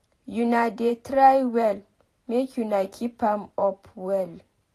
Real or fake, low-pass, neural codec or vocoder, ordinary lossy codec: fake; 14.4 kHz; vocoder, 44.1 kHz, 128 mel bands every 512 samples, BigVGAN v2; AAC, 48 kbps